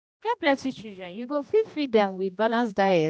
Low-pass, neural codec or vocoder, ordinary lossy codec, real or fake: none; codec, 16 kHz, 1 kbps, X-Codec, HuBERT features, trained on general audio; none; fake